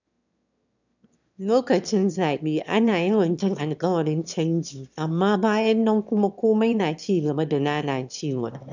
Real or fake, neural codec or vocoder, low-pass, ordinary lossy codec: fake; autoencoder, 22.05 kHz, a latent of 192 numbers a frame, VITS, trained on one speaker; 7.2 kHz; none